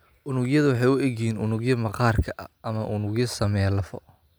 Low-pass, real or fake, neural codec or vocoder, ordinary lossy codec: none; real; none; none